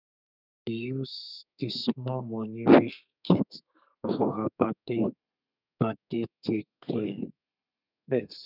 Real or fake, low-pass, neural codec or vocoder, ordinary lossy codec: fake; 5.4 kHz; codec, 32 kHz, 1.9 kbps, SNAC; none